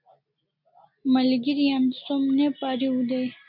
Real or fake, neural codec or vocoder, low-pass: real; none; 5.4 kHz